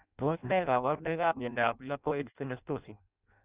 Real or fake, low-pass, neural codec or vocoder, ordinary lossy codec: fake; 3.6 kHz; codec, 16 kHz in and 24 kHz out, 0.6 kbps, FireRedTTS-2 codec; Opus, 64 kbps